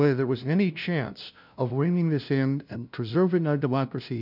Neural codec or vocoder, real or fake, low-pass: codec, 16 kHz, 0.5 kbps, FunCodec, trained on LibriTTS, 25 frames a second; fake; 5.4 kHz